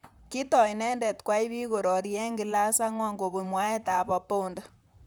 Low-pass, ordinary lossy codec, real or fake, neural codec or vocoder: none; none; fake; vocoder, 44.1 kHz, 128 mel bands, Pupu-Vocoder